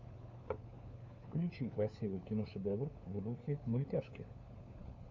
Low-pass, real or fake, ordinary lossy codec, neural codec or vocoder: 7.2 kHz; fake; MP3, 64 kbps; codec, 16 kHz, 4 kbps, FunCodec, trained on LibriTTS, 50 frames a second